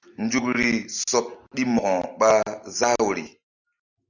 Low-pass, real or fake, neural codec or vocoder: 7.2 kHz; real; none